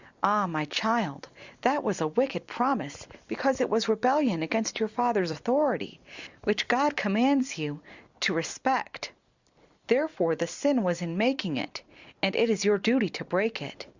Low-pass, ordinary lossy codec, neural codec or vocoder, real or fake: 7.2 kHz; Opus, 64 kbps; vocoder, 44.1 kHz, 128 mel bands every 256 samples, BigVGAN v2; fake